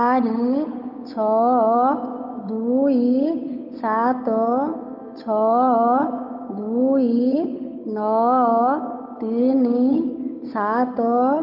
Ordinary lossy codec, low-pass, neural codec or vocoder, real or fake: none; 5.4 kHz; codec, 16 kHz, 8 kbps, FunCodec, trained on Chinese and English, 25 frames a second; fake